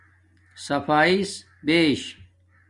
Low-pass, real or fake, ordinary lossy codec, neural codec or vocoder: 10.8 kHz; real; Opus, 64 kbps; none